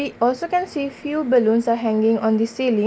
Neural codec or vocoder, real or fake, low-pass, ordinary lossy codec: none; real; none; none